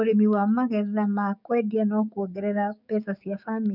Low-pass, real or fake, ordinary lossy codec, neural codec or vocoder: 5.4 kHz; fake; none; codec, 16 kHz, 6 kbps, DAC